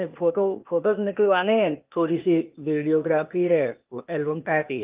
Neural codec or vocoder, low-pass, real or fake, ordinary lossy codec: codec, 16 kHz, 0.8 kbps, ZipCodec; 3.6 kHz; fake; Opus, 32 kbps